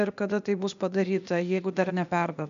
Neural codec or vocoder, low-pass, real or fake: codec, 16 kHz, 0.8 kbps, ZipCodec; 7.2 kHz; fake